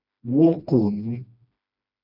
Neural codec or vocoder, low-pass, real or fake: codec, 16 kHz, 1 kbps, FreqCodec, smaller model; 5.4 kHz; fake